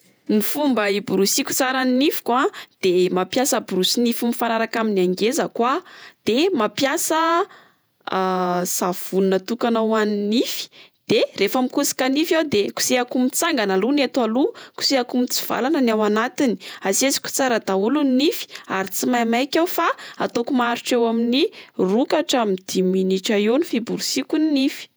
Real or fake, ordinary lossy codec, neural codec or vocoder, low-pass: fake; none; vocoder, 48 kHz, 128 mel bands, Vocos; none